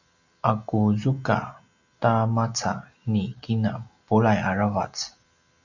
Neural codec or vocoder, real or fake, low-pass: none; real; 7.2 kHz